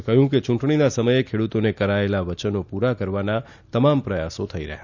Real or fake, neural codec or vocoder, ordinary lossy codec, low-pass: real; none; none; 7.2 kHz